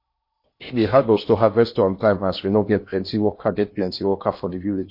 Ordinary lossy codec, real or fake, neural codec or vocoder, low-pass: MP3, 32 kbps; fake; codec, 16 kHz in and 24 kHz out, 0.8 kbps, FocalCodec, streaming, 65536 codes; 5.4 kHz